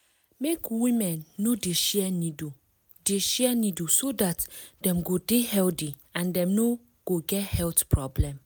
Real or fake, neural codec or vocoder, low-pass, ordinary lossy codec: real; none; none; none